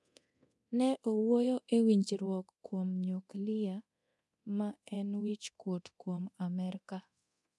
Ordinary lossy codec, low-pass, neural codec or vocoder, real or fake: none; none; codec, 24 kHz, 0.9 kbps, DualCodec; fake